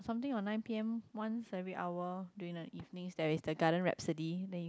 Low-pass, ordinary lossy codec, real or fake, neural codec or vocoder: none; none; real; none